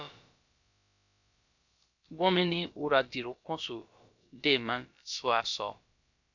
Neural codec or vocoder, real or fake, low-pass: codec, 16 kHz, about 1 kbps, DyCAST, with the encoder's durations; fake; 7.2 kHz